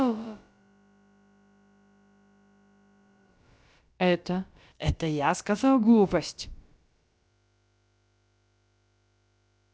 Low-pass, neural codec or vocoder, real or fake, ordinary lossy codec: none; codec, 16 kHz, about 1 kbps, DyCAST, with the encoder's durations; fake; none